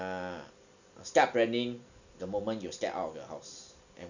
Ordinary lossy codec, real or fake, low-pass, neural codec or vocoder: none; real; 7.2 kHz; none